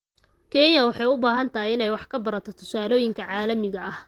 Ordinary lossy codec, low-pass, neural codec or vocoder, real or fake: Opus, 24 kbps; 19.8 kHz; vocoder, 44.1 kHz, 128 mel bands, Pupu-Vocoder; fake